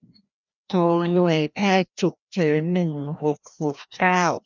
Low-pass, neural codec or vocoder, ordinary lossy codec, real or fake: 7.2 kHz; codec, 16 kHz, 1 kbps, FreqCodec, larger model; none; fake